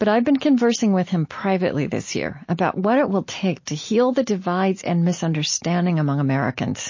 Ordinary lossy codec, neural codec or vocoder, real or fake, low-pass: MP3, 32 kbps; none; real; 7.2 kHz